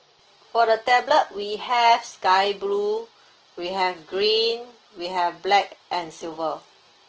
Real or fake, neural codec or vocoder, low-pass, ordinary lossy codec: real; none; 7.2 kHz; Opus, 16 kbps